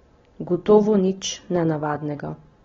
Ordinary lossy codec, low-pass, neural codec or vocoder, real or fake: AAC, 24 kbps; 7.2 kHz; none; real